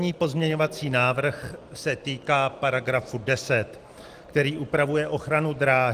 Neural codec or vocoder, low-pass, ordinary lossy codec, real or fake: none; 14.4 kHz; Opus, 24 kbps; real